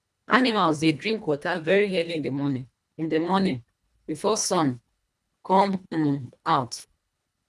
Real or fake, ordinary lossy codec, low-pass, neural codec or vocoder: fake; none; none; codec, 24 kHz, 1.5 kbps, HILCodec